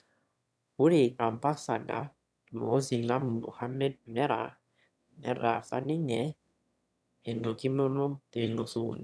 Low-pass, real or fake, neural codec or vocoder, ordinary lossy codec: none; fake; autoencoder, 22.05 kHz, a latent of 192 numbers a frame, VITS, trained on one speaker; none